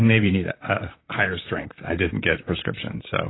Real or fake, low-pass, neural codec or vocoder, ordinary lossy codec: fake; 7.2 kHz; vocoder, 44.1 kHz, 128 mel bands, Pupu-Vocoder; AAC, 16 kbps